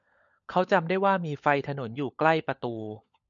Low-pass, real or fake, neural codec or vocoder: 7.2 kHz; fake; codec, 16 kHz, 16 kbps, FunCodec, trained on LibriTTS, 50 frames a second